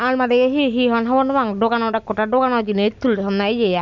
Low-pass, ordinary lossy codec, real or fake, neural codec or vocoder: 7.2 kHz; none; real; none